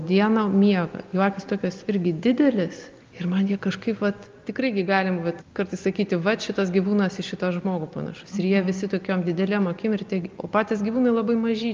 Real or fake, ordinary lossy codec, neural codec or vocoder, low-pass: real; Opus, 24 kbps; none; 7.2 kHz